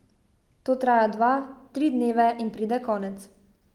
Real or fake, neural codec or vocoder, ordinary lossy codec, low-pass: real; none; Opus, 24 kbps; 19.8 kHz